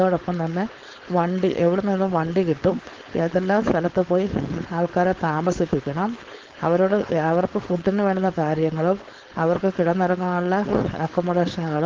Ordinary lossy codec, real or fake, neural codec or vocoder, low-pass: Opus, 16 kbps; fake; codec, 16 kHz, 4.8 kbps, FACodec; 7.2 kHz